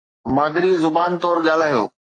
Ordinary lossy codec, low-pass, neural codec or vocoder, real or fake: AAC, 48 kbps; 9.9 kHz; codec, 44.1 kHz, 3.4 kbps, Pupu-Codec; fake